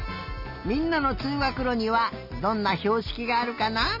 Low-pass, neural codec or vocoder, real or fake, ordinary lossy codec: 5.4 kHz; none; real; none